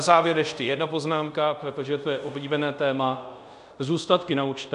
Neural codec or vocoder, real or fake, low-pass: codec, 24 kHz, 0.5 kbps, DualCodec; fake; 10.8 kHz